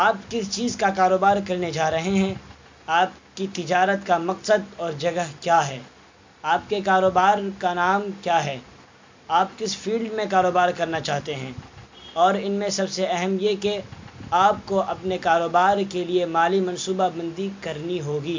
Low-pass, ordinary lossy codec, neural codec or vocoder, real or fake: 7.2 kHz; MP3, 48 kbps; none; real